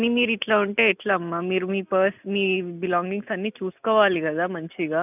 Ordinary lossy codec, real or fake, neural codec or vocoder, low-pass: none; real; none; 3.6 kHz